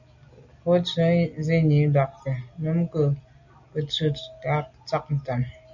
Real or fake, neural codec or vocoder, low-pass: real; none; 7.2 kHz